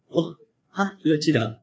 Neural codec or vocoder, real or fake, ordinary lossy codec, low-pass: codec, 16 kHz, 2 kbps, FreqCodec, larger model; fake; none; none